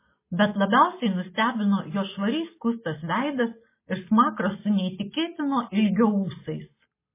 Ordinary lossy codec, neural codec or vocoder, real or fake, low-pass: MP3, 16 kbps; none; real; 3.6 kHz